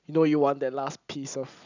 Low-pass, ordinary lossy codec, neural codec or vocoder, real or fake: 7.2 kHz; none; none; real